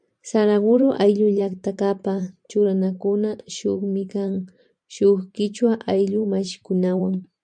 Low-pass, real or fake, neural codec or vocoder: 9.9 kHz; fake; vocoder, 44.1 kHz, 128 mel bands every 512 samples, BigVGAN v2